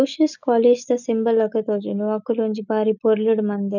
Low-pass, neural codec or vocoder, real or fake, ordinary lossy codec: 7.2 kHz; none; real; none